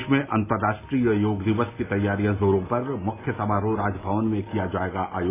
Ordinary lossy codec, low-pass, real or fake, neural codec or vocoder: AAC, 16 kbps; 3.6 kHz; real; none